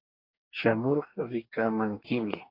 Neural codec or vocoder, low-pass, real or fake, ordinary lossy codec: codec, 44.1 kHz, 2.6 kbps, DAC; 5.4 kHz; fake; AAC, 32 kbps